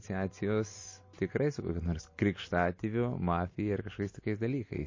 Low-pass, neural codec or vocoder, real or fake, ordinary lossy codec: 7.2 kHz; none; real; MP3, 32 kbps